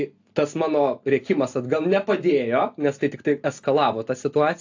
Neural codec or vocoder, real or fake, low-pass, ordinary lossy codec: none; real; 7.2 kHz; AAC, 48 kbps